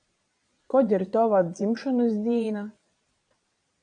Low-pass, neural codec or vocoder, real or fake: 9.9 kHz; vocoder, 22.05 kHz, 80 mel bands, Vocos; fake